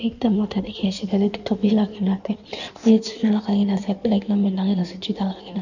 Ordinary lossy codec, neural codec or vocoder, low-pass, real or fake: none; codec, 16 kHz in and 24 kHz out, 1.1 kbps, FireRedTTS-2 codec; 7.2 kHz; fake